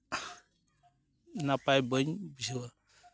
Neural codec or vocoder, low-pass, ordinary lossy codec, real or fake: none; none; none; real